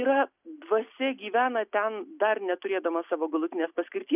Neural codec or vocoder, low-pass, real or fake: none; 3.6 kHz; real